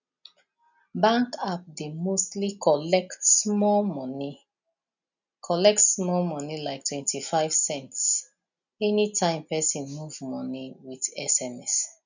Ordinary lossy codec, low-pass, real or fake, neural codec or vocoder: none; 7.2 kHz; real; none